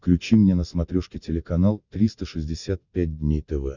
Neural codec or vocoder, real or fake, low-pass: none; real; 7.2 kHz